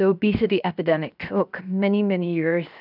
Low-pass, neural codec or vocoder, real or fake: 5.4 kHz; codec, 16 kHz, 0.7 kbps, FocalCodec; fake